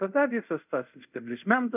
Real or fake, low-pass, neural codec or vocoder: fake; 3.6 kHz; codec, 24 kHz, 0.5 kbps, DualCodec